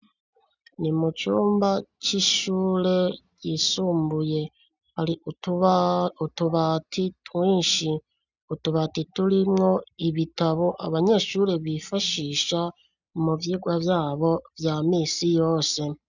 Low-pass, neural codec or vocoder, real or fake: 7.2 kHz; none; real